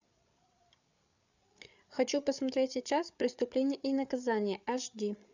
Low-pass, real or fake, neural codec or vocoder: 7.2 kHz; fake; codec, 16 kHz, 8 kbps, FreqCodec, larger model